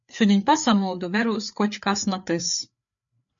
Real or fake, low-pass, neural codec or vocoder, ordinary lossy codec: fake; 7.2 kHz; codec, 16 kHz, 4 kbps, FreqCodec, larger model; MP3, 64 kbps